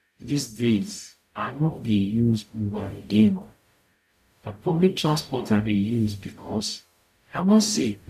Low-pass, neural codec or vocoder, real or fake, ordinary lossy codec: 14.4 kHz; codec, 44.1 kHz, 0.9 kbps, DAC; fake; none